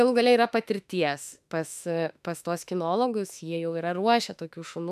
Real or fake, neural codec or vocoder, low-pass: fake; autoencoder, 48 kHz, 32 numbers a frame, DAC-VAE, trained on Japanese speech; 14.4 kHz